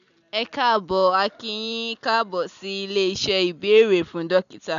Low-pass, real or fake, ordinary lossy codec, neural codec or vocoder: 7.2 kHz; real; none; none